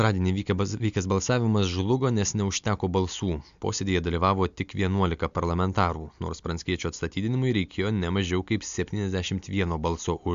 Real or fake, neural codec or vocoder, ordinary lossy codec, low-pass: real; none; MP3, 64 kbps; 7.2 kHz